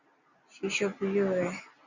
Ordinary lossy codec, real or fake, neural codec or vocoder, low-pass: Opus, 64 kbps; real; none; 7.2 kHz